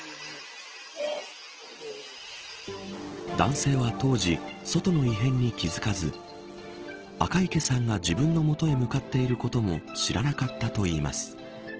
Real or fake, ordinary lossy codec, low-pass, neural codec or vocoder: real; Opus, 16 kbps; 7.2 kHz; none